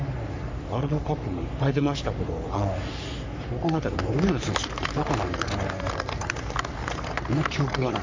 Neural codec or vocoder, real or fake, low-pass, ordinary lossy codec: codec, 44.1 kHz, 3.4 kbps, Pupu-Codec; fake; 7.2 kHz; MP3, 64 kbps